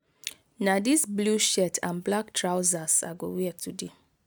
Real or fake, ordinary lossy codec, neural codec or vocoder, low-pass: real; none; none; none